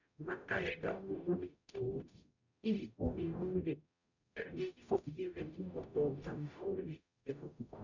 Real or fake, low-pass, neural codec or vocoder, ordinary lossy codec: fake; 7.2 kHz; codec, 44.1 kHz, 0.9 kbps, DAC; none